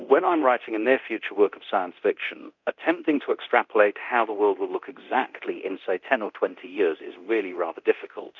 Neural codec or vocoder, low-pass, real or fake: codec, 24 kHz, 0.9 kbps, DualCodec; 7.2 kHz; fake